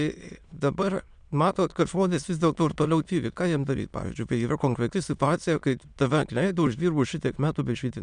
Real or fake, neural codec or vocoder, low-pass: fake; autoencoder, 22.05 kHz, a latent of 192 numbers a frame, VITS, trained on many speakers; 9.9 kHz